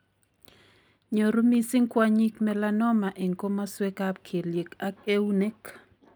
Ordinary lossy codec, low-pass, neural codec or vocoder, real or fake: none; none; none; real